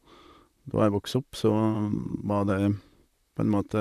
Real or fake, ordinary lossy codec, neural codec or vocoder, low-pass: fake; none; vocoder, 44.1 kHz, 128 mel bands, Pupu-Vocoder; 14.4 kHz